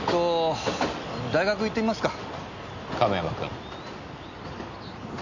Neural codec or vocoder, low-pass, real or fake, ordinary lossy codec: none; 7.2 kHz; real; none